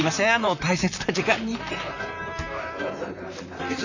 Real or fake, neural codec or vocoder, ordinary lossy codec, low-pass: fake; vocoder, 44.1 kHz, 128 mel bands, Pupu-Vocoder; none; 7.2 kHz